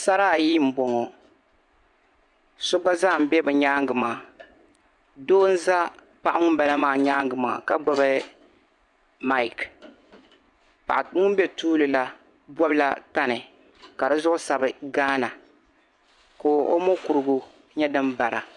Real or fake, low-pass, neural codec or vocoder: fake; 10.8 kHz; vocoder, 24 kHz, 100 mel bands, Vocos